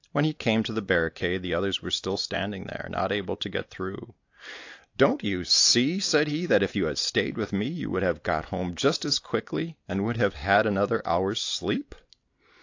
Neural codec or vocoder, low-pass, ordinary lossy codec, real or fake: none; 7.2 kHz; AAC, 48 kbps; real